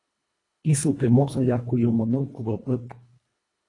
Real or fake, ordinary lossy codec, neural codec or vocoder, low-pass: fake; AAC, 32 kbps; codec, 24 kHz, 1.5 kbps, HILCodec; 10.8 kHz